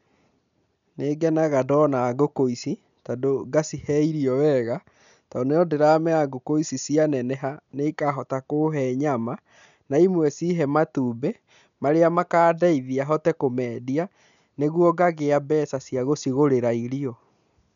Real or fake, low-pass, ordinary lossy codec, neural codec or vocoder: real; 7.2 kHz; none; none